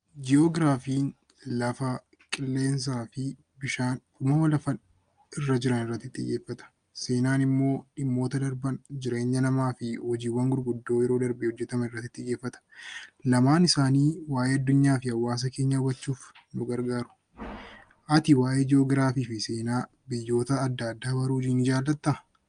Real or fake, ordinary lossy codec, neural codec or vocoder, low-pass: real; Opus, 32 kbps; none; 9.9 kHz